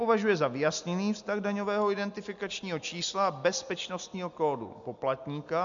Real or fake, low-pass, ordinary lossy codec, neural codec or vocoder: real; 7.2 kHz; MP3, 64 kbps; none